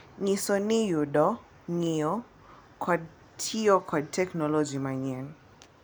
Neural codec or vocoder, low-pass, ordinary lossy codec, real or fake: none; none; none; real